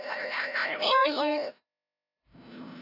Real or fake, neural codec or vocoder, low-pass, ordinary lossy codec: fake; codec, 16 kHz, 0.5 kbps, FreqCodec, larger model; 5.4 kHz; none